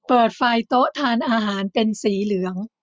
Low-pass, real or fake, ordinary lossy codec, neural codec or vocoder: none; real; none; none